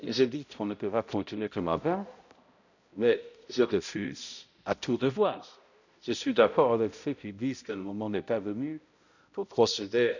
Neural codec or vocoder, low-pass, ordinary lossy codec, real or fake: codec, 16 kHz, 0.5 kbps, X-Codec, HuBERT features, trained on balanced general audio; 7.2 kHz; none; fake